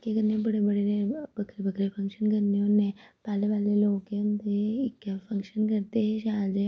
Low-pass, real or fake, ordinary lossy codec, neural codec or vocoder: none; real; none; none